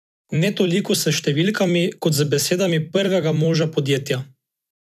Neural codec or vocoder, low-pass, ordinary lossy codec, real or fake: vocoder, 44.1 kHz, 128 mel bands every 256 samples, BigVGAN v2; 14.4 kHz; none; fake